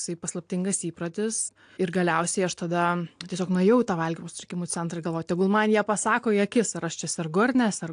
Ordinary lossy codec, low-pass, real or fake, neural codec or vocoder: AAC, 64 kbps; 9.9 kHz; real; none